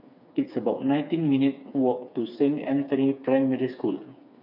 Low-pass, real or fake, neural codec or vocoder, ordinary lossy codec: 5.4 kHz; fake; codec, 16 kHz, 4 kbps, FreqCodec, smaller model; none